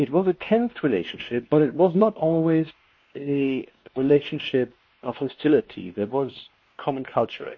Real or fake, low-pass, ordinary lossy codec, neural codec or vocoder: fake; 7.2 kHz; MP3, 32 kbps; codec, 16 kHz, 2 kbps, X-Codec, WavLM features, trained on Multilingual LibriSpeech